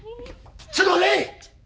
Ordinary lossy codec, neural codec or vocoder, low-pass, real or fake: none; codec, 16 kHz, 4 kbps, X-Codec, HuBERT features, trained on balanced general audio; none; fake